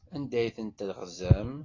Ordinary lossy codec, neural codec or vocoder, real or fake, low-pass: AAC, 32 kbps; none; real; 7.2 kHz